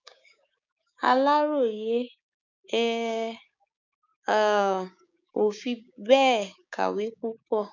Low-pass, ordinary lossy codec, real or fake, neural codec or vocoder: 7.2 kHz; none; fake; codec, 16 kHz, 6 kbps, DAC